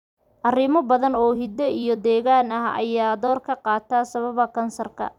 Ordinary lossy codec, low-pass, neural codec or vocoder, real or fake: none; 19.8 kHz; vocoder, 44.1 kHz, 128 mel bands every 256 samples, BigVGAN v2; fake